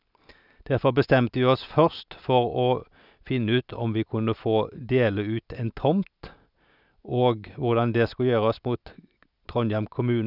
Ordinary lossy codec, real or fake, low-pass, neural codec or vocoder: none; real; 5.4 kHz; none